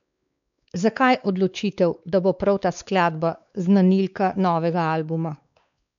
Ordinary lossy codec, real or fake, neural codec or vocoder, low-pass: none; fake; codec, 16 kHz, 4 kbps, X-Codec, WavLM features, trained on Multilingual LibriSpeech; 7.2 kHz